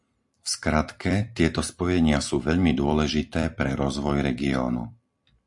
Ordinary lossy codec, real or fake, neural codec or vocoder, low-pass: MP3, 64 kbps; fake; vocoder, 44.1 kHz, 128 mel bands every 256 samples, BigVGAN v2; 10.8 kHz